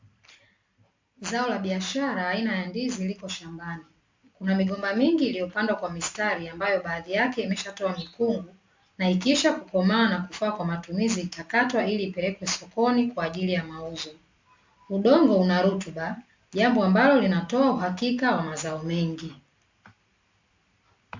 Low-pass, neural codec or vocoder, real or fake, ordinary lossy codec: 7.2 kHz; none; real; MP3, 64 kbps